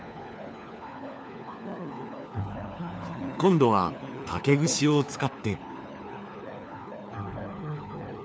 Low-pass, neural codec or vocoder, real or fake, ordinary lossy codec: none; codec, 16 kHz, 4 kbps, FunCodec, trained on LibriTTS, 50 frames a second; fake; none